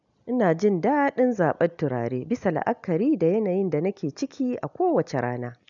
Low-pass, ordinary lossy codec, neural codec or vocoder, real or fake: 7.2 kHz; none; none; real